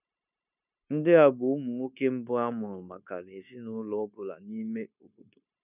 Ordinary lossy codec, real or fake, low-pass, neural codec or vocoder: none; fake; 3.6 kHz; codec, 16 kHz, 0.9 kbps, LongCat-Audio-Codec